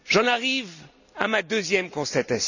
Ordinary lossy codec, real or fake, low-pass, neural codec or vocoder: none; real; 7.2 kHz; none